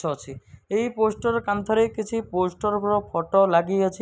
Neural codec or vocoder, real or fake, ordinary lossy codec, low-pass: none; real; none; none